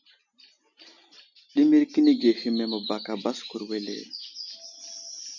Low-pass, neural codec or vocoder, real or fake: 7.2 kHz; none; real